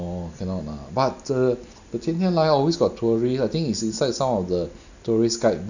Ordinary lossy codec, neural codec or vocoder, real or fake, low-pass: none; none; real; 7.2 kHz